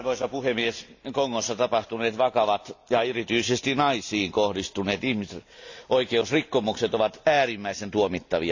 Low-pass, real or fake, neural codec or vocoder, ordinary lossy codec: 7.2 kHz; real; none; AAC, 48 kbps